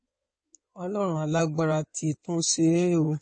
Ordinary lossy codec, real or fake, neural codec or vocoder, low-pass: MP3, 32 kbps; fake; codec, 16 kHz in and 24 kHz out, 2.2 kbps, FireRedTTS-2 codec; 9.9 kHz